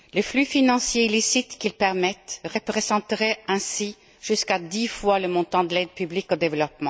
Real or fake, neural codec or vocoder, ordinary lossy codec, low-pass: real; none; none; none